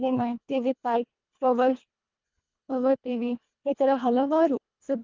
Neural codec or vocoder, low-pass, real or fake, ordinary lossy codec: codec, 24 kHz, 1.5 kbps, HILCodec; 7.2 kHz; fake; Opus, 24 kbps